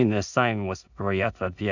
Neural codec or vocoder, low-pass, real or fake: codec, 16 kHz in and 24 kHz out, 0.4 kbps, LongCat-Audio-Codec, two codebook decoder; 7.2 kHz; fake